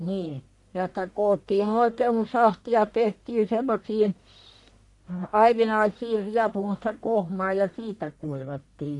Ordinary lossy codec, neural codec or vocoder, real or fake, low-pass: AAC, 64 kbps; codec, 44.1 kHz, 1.7 kbps, Pupu-Codec; fake; 10.8 kHz